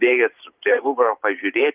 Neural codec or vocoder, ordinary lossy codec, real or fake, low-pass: vocoder, 44.1 kHz, 128 mel bands every 512 samples, BigVGAN v2; Opus, 32 kbps; fake; 3.6 kHz